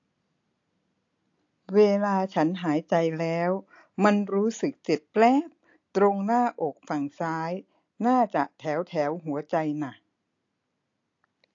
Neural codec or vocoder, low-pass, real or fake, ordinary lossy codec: none; 7.2 kHz; real; AAC, 48 kbps